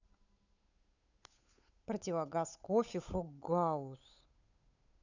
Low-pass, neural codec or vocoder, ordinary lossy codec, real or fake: 7.2 kHz; codec, 16 kHz, 8 kbps, FunCodec, trained on Chinese and English, 25 frames a second; none; fake